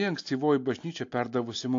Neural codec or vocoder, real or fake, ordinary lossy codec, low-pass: none; real; AAC, 48 kbps; 7.2 kHz